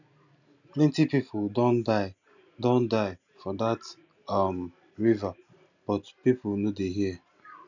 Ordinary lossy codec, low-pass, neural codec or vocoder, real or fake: none; 7.2 kHz; none; real